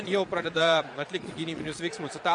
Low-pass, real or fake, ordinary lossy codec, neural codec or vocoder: 9.9 kHz; fake; MP3, 48 kbps; vocoder, 22.05 kHz, 80 mel bands, Vocos